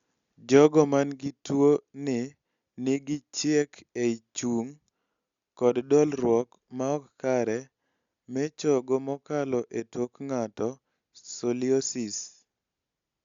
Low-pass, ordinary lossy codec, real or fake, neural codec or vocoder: 7.2 kHz; Opus, 64 kbps; real; none